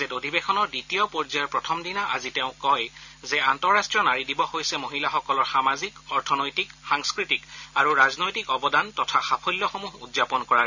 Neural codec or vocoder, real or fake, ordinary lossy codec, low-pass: none; real; none; 7.2 kHz